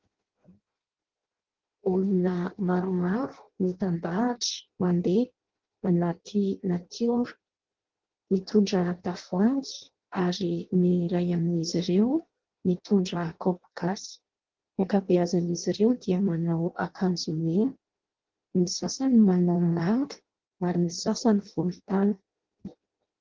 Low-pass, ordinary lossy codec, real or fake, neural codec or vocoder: 7.2 kHz; Opus, 16 kbps; fake; codec, 16 kHz in and 24 kHz out, 0.6 kbps, FireRedTTS-2 codec